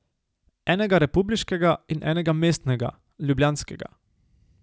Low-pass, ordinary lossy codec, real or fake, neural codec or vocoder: none; none; real; none